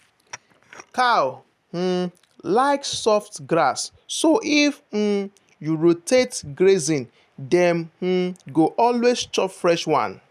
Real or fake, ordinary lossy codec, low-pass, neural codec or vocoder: real; none; 14.4 kHz; none